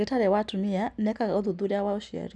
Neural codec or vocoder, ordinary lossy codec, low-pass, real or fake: none; none; none; real